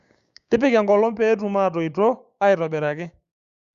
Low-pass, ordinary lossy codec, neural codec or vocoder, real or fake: 7.2 kHz; Opus, 64 kbps; codec, 16 kHz, 6 kbps, DAC; fake